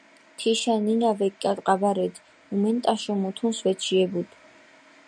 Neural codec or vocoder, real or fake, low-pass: none; real; 9.9 kHz